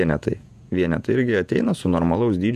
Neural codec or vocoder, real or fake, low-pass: vocoder, 48 kHz, 128 mel bands, Vocos; fake; 14.4 kHz